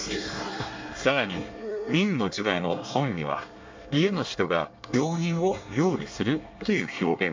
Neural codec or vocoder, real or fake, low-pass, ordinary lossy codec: codec, 24 kHz, 1 kbps, SNAC; fake; 7.2 kHz; MP3, 64 kbps